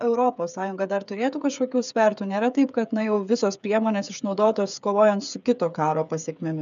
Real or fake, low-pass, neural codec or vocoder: fake; 7.2 kHz; codec, 16 kHz, 8 kbps, FreqCodec, smaller model